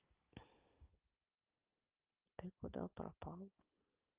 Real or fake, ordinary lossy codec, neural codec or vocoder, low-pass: real; Opus, 24 kbps; none; 3.6 kHz